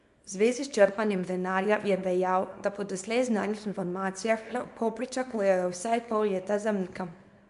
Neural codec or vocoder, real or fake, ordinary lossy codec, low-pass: codec, 24 kHz, 0.9 kbps, WavTokenizer, small release; fake; MP3, 96 kbps; 10.8 kHz